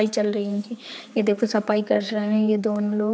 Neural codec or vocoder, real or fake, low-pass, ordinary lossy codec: codec, 16 kHz, 4 kbps, X-Codec, HuBERT features, trained on general audio; fake; none; none